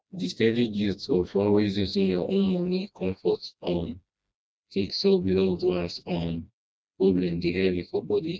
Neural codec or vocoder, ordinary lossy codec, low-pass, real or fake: codec, 16 kHz, 1 kbps, FreqCodec, smaller model; none; none; fake